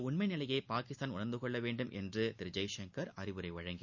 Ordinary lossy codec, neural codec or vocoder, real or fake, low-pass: none; none; real; 7.2 kHz